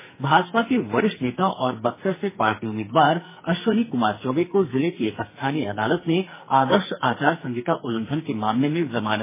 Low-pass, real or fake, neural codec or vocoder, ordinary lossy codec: 3.6 kHz; fake; codec, 44.1 kHz, 2.6 kbps, SNAC; MP3, 16 kbps